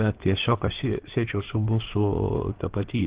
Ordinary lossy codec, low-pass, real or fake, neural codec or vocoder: Opus, 16 kbps; 3.6 kHz; fake; codec, 16 kHz in and 24 kHz out, 2.2 kbps, FireRedTTS-2 codec